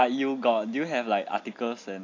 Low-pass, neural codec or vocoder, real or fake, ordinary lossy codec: 7.2 kHz; none; real; AAC, 48 kbps